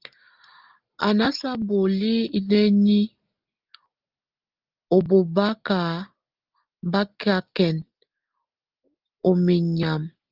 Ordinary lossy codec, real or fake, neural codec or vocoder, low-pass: Opus, 32 kbps; real; none; 5.4 kHz